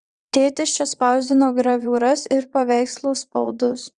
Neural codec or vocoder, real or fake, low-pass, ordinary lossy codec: vocoder, 22.05 kHz, 80 mel bands, WaveNeXt; fake; 9.9 kHz; Opus, 64 kbps